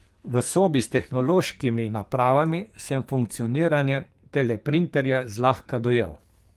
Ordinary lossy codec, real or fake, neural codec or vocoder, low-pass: Opus, 32 kbps; fake; codec, 44.1 kHz, 2.6 kbps, SNAC; 14.4 kHz